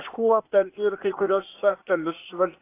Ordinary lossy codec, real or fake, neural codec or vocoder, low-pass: AAC, 24 kbps; fake; codec, 16 kHz, 0.8 kbps, ZipCodec; 3.6 kHz